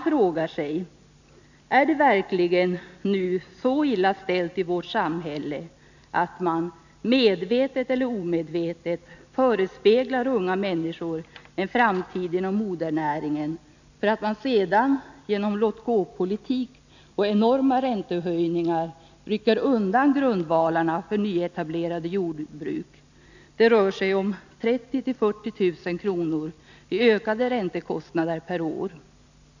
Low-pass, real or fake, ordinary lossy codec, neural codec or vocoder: 7.2 kHz; real; none; none